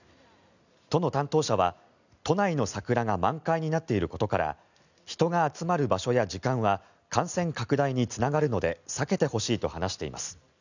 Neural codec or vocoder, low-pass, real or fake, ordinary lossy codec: none; 7.2 kHz; real; none